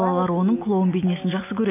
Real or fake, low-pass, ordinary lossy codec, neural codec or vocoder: real; 3.6 kHz; AAC, 24 kbps; none